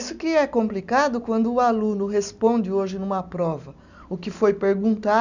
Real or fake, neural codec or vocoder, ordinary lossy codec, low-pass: real; none; none; 7.2 kHz